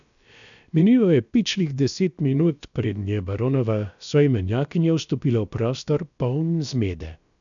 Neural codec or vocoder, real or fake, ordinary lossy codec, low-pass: codec, 16 kHz, about 1 kbps, DyCAST, with the encoder's durations; fake; none; 7.2 kHz